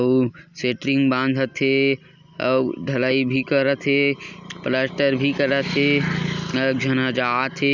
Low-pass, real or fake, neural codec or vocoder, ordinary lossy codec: 7.2 kHz; real; none; none